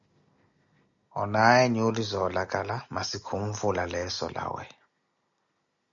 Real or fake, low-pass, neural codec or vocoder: real; 7.2 kHz; none